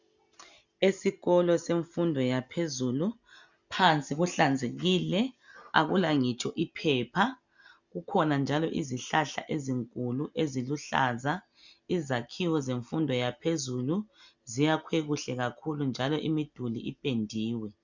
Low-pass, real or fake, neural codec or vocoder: 7.2 kHz; real; none